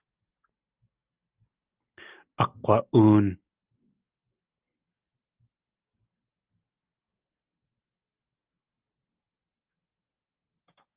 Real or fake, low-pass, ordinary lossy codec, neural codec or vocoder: real; 3.6 kHz; Opus, 32 kbps; none